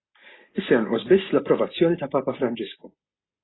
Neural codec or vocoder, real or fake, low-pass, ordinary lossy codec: none; real; 7.2 kHz; AAC, 16 kbps